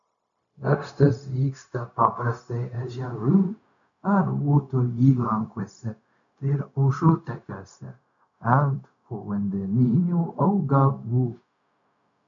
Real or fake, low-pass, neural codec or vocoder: fake; 7.2 kHz; codec, 16 kHz, 0.4 kbps, LongCat-Audio-Codec